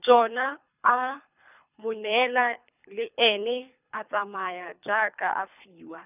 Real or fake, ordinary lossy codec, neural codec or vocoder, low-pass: fake; none; codec, 24 kHz, 3 kbps, HILCodec; 3.6 kHz